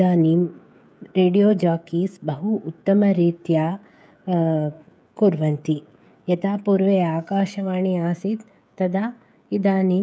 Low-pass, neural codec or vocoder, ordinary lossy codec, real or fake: none; codec, 16 kHz, 16 kbps, FreqCodec, smaller model; none; fake